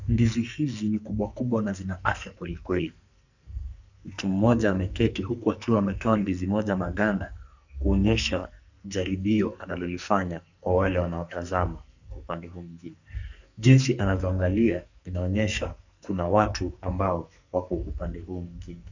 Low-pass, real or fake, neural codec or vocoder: 7.2 kHz; fake; codec, 44.1 kHz, 2.6 kbps, SNAC